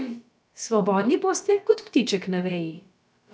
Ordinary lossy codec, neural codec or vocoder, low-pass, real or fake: none; codec, 16 kHz, about 1 kbps, DyCAST, with the encoder's durations; none; fake